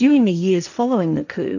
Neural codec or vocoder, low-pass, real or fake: codec, 16 kHz, 1.1 kbps, Voila-Tokenizer; 7.2 kHz; fake